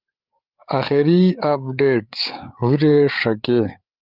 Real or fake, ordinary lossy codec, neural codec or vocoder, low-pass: fake; Opus, 24 kbps; codec, 16 kHz, 8 kbps, FreqCodec, larger model; 5.4 kHz